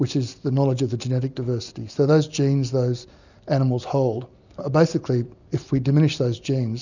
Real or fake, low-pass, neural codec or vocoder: real; 7.2 kHz; none